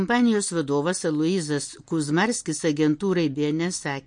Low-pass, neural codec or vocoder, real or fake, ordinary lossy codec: 9.9 kHz; none; real; MP3, 48 kbps